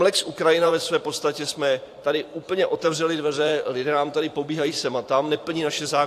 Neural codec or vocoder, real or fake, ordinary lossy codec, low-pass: vocoder, 44.1 kHz, 128 mel bands every 512 samples, BigVGAN v2; fake; AAC, 64 kbps; 14.4 kHz